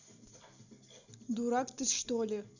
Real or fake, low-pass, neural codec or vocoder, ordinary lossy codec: real; 7.2 kHz; none; none